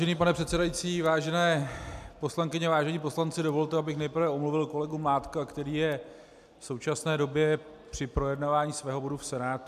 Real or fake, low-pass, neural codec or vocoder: real; 14.4 kHz; none